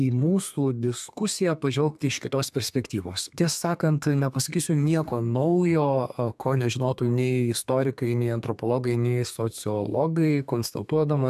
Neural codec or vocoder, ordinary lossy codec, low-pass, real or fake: codec, 32 kHz, 1.9 kbps, SNAC; AAC, 96 kbps; 14.4 kHz; fake